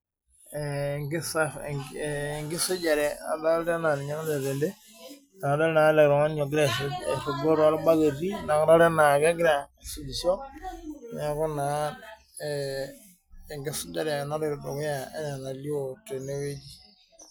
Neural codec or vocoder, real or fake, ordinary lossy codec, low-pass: none; real; none; none